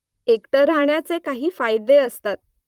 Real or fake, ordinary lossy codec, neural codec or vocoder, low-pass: fake; Opus, 32 kbps; vocoder, 44.1 kHz, 128 mel bands, Pupu-Vocoder; 19.8 kHz